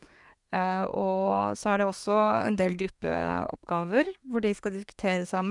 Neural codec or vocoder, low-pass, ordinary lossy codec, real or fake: codec, 24 kHz, 1 kbps, SNAC; 10.8 kHz; none; fake